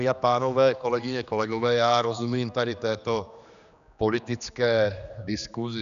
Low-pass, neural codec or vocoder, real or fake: 7.2 kHz; codec, 16 kHz, 2 kbps, X-Codec, HuBERT features, trained on general audio; fake